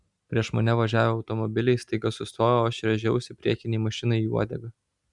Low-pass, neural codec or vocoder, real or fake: 10.8 kHz; none; real